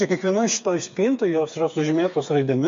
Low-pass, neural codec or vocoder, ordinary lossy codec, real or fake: 7.2 kHz; codec, 16 kHz, 4 kbps, FreqCodec, smaller model; MP3, 48 kbps; fake